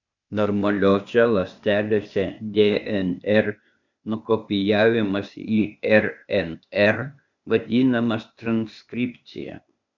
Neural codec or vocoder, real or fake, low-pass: codec, 16 kHz, 0.8 kbps, ZipCodec; fake; 7.2 kHz